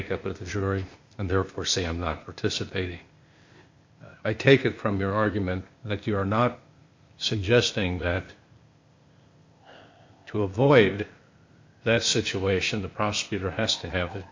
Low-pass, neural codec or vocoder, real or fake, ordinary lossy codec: 7.2 kHz; codec, 16 kHz, 0.8 kbps, ZipCodec; fake; MP3, 48 kbps